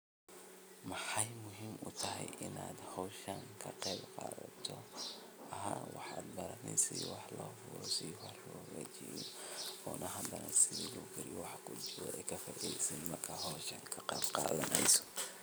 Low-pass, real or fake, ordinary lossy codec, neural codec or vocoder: none; real; none; none